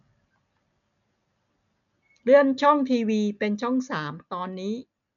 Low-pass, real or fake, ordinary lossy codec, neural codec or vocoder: 7.2 kHz; real; none; none